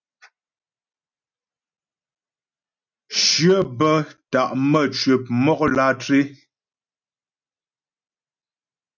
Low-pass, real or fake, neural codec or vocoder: 7.2 kHz; real; none